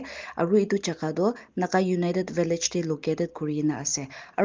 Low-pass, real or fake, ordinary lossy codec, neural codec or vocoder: 7.2 kHz; real; Opus, 24 kbps; none